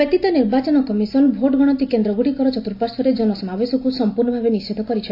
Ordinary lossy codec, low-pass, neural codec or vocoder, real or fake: AAC, 32 kbps; 5.4 kHz; none; real